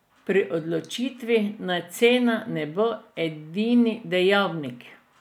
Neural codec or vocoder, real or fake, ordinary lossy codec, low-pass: none; real; none; 19.8 kHz